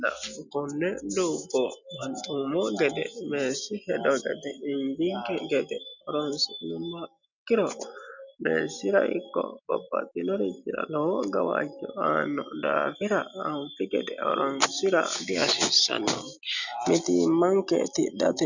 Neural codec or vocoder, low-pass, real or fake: none; 7.2 kHz; real